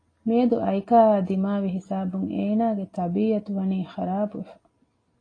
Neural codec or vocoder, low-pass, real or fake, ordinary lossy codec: none; 9.9 kHz; real; AAC, 32 kbps